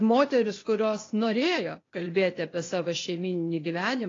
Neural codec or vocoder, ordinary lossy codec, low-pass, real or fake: codec, 16 kHz, 0.8 kbps, ZipCodec; AAC, 32 kbps; 7.2 kHz; fake